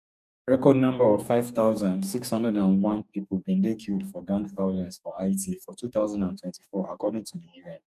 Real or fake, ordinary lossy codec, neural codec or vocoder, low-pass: fake; none; codec, 44.1 kHz, 2.6 kbps, DAC; 14.4 kHz